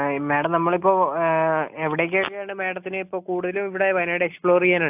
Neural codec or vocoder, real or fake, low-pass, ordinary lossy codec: none; real; 3.6 kHz; AAC, 32 kbps